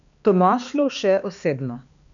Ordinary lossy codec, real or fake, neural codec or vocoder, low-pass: none; fake; codec, 16 kHz, 2 kbps, X-Codec, HuBERT features, trained on balanced general audio; 7.2 kHz